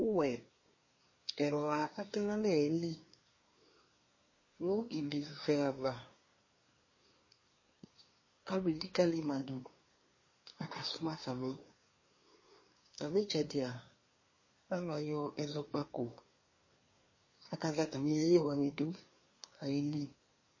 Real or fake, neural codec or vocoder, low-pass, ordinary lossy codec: fake; codec, 24 kHz, 1 kbps, SNAC; 7.2 kHz; MP3, 32 kbps